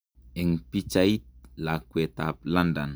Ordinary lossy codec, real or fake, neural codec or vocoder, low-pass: none; real; none; none